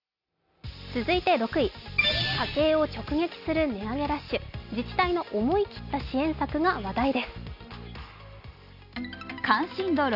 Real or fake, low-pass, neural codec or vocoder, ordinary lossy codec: real; 5.4 kHz; none; none